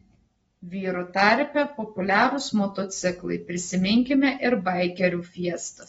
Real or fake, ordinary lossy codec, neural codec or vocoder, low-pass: real; AAC, 24 kbps; none; 10.8 kHz